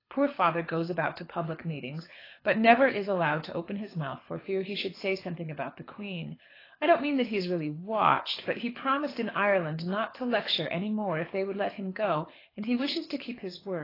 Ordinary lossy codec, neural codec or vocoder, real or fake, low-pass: AAC, 24 kbps; codec, 24 kHz, 6 kbps, HILCodec; fake; 5.4 kHz